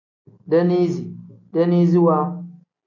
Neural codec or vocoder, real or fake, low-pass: none; real; 7.2 kHz